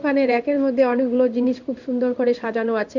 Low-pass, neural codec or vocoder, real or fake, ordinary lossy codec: 7.2 kHz; codec, 16 kHz in and 24 kHz out, 1 kbps, XY-Tokenizer; fake; none